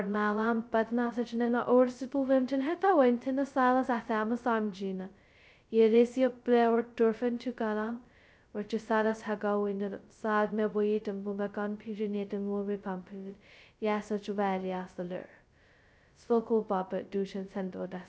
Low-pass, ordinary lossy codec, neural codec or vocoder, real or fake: none; none; codec, 16 kHz, 0.2 kbps, FocalCodec; fake